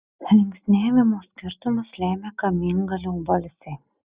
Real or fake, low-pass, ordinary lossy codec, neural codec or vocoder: real; 3.6 kHz; Opus, 64 kbps; none